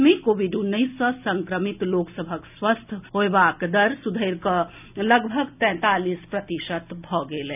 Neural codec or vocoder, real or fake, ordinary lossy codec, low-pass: none; real; none; 3.6 kHz